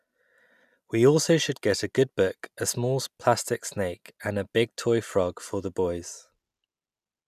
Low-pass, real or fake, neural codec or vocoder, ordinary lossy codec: 14.4 kHz; real; none; none